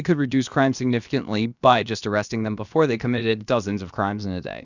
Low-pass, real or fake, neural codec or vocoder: 7.2 kHz; fake; codec, 16 kHz, about 1 kbps, DyCAST, with the encoder's durations